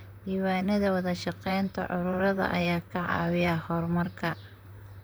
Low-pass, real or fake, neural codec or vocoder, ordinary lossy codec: none; fake; vocoder, 44.1 kHz, 128 mel bands, Pupu-Vocoder; none